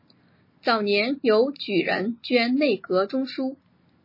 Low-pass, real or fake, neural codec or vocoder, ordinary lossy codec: 5.4 kHz; real; none; MP3, 24 kbps